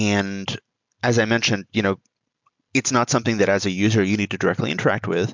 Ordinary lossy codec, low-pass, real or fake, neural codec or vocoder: MP3, 64 kbps; 7.2 kHz; real; none